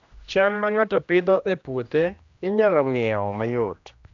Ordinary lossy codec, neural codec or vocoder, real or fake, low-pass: AAC, 64 kbps; codec, 16 kHz, 1 kbps, X-Codec, HuBERT features, trained on general audio; fake; 7.2 kHz